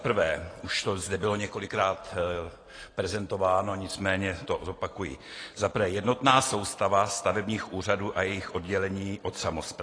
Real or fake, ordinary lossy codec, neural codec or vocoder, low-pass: real; AAC, 32 kbps; none; 9.9 kHz